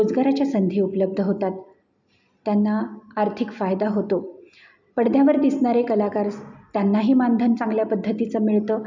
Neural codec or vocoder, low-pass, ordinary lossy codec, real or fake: none; 7.2 kHz; none; real